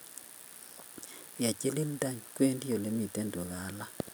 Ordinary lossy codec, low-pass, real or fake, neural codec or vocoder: none; none; real; none